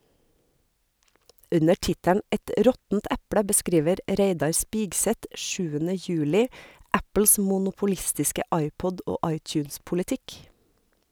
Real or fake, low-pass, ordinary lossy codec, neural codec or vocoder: real; none; none; none